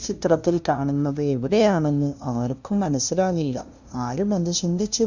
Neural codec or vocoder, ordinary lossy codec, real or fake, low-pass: codec, 16 kHz, 0.5 kbps, FunCodec, trained on LibriTTS, 25 frames a second; Opus, 64 kbps; fake; 7.2 kHz